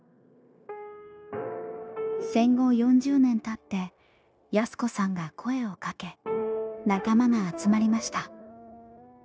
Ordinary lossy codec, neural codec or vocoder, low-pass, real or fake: none; codec, 16 kHz, 0.9 kbps, LongCat-Audio-Codec; none; fake